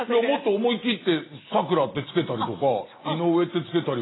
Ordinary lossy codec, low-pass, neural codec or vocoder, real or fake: AAC, 16 kbps; 7.2 kHz; none; real